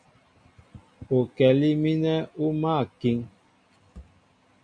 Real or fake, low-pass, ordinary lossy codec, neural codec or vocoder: real; 9.9 kHz; AAC, 64 kbps; none